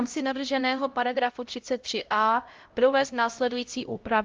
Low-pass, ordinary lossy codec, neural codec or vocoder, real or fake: 7.2 kHz; Opus, 32 kbps; codec, 16 kHz, 0.5 kbps, X-Codec, HuBERT features, trained on LibriSpeech; fake